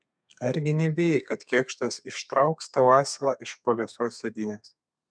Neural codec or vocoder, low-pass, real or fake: codec, 32 kHz, 1.9 kbps, SNAC; 9.9 kHz; fake